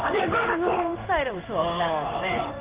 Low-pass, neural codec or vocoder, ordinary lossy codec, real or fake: 3.6 kHz; codec, 16 kHz in and 24 kHz out, 1 kbps, XY-Tokenizer; Opus, 32 kbps; fake